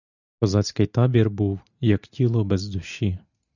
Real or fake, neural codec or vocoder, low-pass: real; none; 7.2 kHz